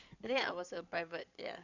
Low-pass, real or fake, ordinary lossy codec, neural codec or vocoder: 7.2 kHz; fake; none; codec, 16 kHz in and 24 kHz out, 2.2 kbps, FireRedTTS-2 codec